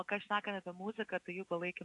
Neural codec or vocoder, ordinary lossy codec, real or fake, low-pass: none; AAC, 48 kbps; real; 10.8 kHz